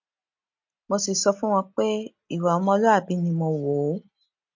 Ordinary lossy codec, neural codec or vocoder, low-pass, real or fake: MP3, 48 kbps; none; 7.2 kHz; real